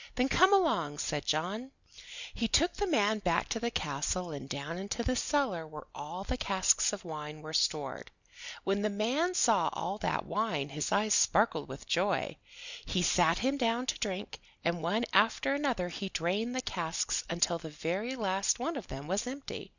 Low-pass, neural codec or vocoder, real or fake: 7.2 kHz; none; real